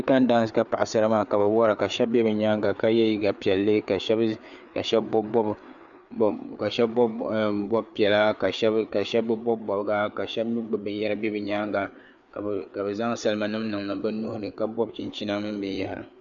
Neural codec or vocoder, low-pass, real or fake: codec, 16 kHz, 4 kbps, FreqCodec, larger model; 7.2 kHz; fake